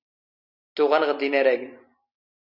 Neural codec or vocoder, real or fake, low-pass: none; real; 5.4 kHz